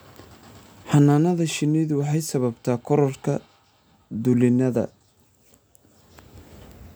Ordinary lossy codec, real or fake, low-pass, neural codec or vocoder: none; real; none; none